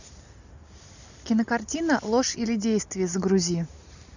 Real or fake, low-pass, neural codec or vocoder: real; 7.2 kHz; none